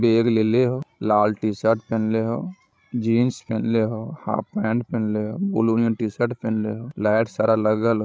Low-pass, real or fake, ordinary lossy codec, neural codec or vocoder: none; fake; none; codec, 16 kHz, 16 kbps, FreqCodec, larger model